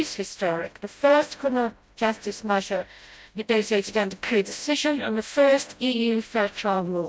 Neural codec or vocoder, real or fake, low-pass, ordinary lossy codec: codec, 16 kHz, 0.5 kbps, FreqCodec, smaller model; fake; none; none